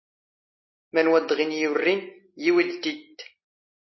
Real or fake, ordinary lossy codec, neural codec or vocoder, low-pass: real; MP3, 24 kbps; none; 7.2 kHz